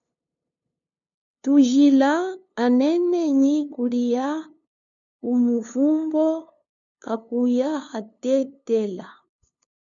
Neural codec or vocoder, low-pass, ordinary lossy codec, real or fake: codec, 16 kHz, 2 kbps, FunCodec, trained on LibriTTS, 25 frames a second; 7.2 kHz; MP3, 96 kbps; fake